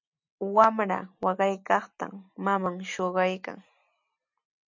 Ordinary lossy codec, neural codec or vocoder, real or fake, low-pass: MP3, 64 kbps; none; real; 7.2 kHz